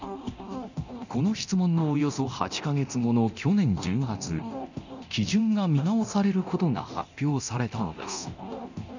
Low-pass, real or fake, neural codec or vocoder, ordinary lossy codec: 7.2 kHz; fake; codec, 24 kHz, 0.9 kbps, DualCodec; none